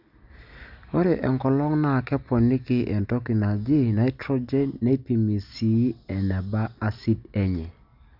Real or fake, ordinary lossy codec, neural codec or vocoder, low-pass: real; Opus, 64 kbps; none; 5.4 kHz